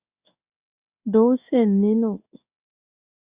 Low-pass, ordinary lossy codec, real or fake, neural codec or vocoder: 3.6 kHz; Opus, 64 kbps; fake; codec, 16 kHz in and 24 kHz out, 1 kbps, XY-Tokenizer